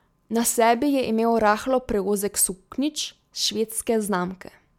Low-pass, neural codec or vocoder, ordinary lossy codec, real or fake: 19.8 kHz; none; MP3, 96 kbps; real